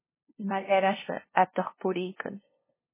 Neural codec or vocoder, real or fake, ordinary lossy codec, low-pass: codec, 16 kHz, 0.5 kbps, FunCodec, trained on LibriTTS, 25 frames a second; fake; MP3, 16 kbps; 3.6 kHz